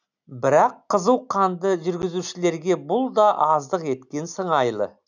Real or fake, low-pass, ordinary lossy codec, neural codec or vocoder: real; 7.2 kHz; none; none